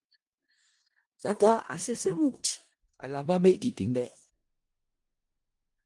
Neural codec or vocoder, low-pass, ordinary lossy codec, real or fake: codec, 16 kHz in and 24 kHz out, 0.4 kbps, LongCat-Audio-Codec, four codebook decoder; 10.8 kHz; Opus, 24 kbps; fake